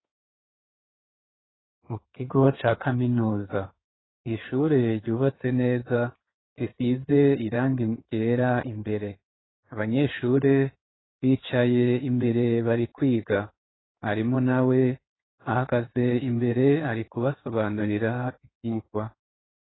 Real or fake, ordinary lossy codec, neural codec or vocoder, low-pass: fake; AAC, 16 kbps; codec, 16 kHz in and 24 kHz out, 2.2 kbps, FireRedTTS-2 codec; 7.2 kHz